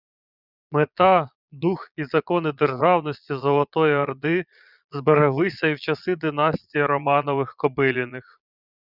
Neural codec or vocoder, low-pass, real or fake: vocoder, 22.05 kHz, 80 mel bands, Vocos; 5.4 kHz; fake